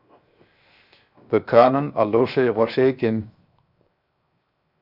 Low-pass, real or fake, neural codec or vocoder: 5.4 kHz; fake; codec, 16 kHz, 0.7 kbps, FocalCodec